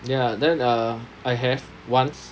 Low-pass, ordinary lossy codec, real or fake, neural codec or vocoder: none; none; real; none